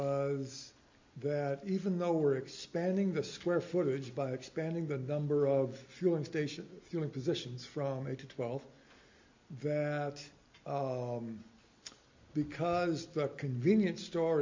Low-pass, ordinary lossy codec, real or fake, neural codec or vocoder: 7.2 kHz; MP3, 64 kbps; real; none